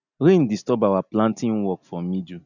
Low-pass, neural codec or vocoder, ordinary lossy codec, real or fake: 7.2 kHz; none; none; real